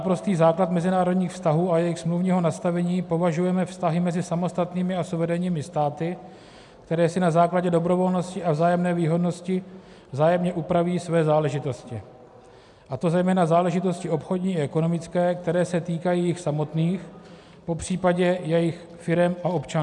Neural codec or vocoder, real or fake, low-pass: none; real; 10.8 kHz